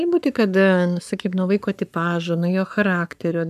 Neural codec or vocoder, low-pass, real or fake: codec, 44.1 kHz, 7.8 kbps, Pupu-Codec; 14.4 kHz; fake